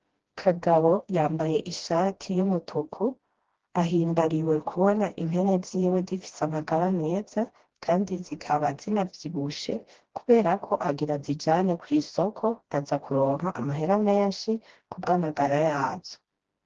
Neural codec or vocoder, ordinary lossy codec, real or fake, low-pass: codec, 16 kHz, 1 kbps, FreqCodec, smaller model; Opus, 16 kbps; fake; 7.2 kHz